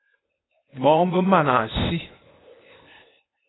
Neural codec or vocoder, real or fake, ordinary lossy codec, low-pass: codec, 16 kHz, 0.8 kbps, ZipCodec; fake; AAC, 16 kbps; 7.2 kHz